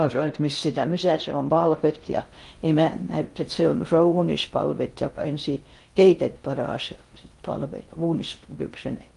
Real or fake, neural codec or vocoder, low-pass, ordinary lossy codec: fake; codec, 16 kHz in and 24 kHz out, 0.6 kbps, FocalCodec, streaming, 2048 codes; 10.8 kHz; Opus, 32 kbps